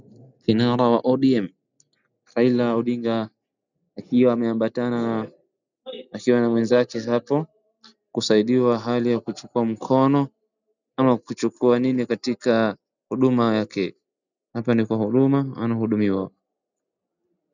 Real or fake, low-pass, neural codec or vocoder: real; 7.2 kHz; none